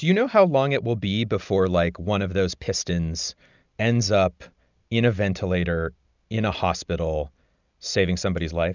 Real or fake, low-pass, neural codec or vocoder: real; 7.2 kHz; none